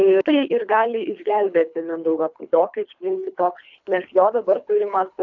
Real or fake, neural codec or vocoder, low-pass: fake; codec, 24 kHz, 3 kbps, HILCodec; 7.2 kHz